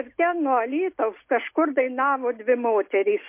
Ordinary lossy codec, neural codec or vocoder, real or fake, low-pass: MP3, 24 kbps; none; real; 3.6 kHz